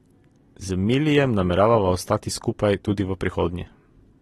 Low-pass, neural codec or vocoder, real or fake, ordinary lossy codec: 19.8 kHz; none; real; AAC, 32 kbps